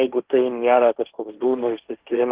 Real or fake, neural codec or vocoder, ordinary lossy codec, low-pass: fake; codec, 16 kHz, 1.1 kbps, Voila-Tokenizer; Opus, 16 kbps; 3.6 kHz